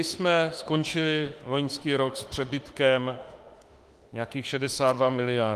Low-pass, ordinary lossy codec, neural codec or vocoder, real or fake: 14.4 kHz; Opus, 24 kbps; autoencoder, 48 kHz, 32 numbers a frame, DAC-VAE, trained on Japanese speech; fake